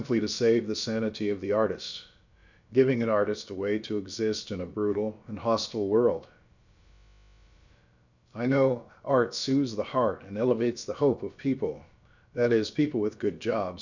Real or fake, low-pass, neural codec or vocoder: fake; 7.2 kHz; codec, 16 kHz, about 1 kbps, DyCAST, with the encoder's durations